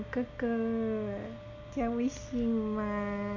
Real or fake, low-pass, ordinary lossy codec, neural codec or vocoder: real; 7.2 kHz; none; none